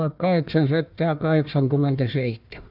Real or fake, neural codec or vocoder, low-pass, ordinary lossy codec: fake; codec, 16 kHz, 1 kbps, FunCodec, trained on Chinese and English, 50 frames a second; 5.4 kHz; none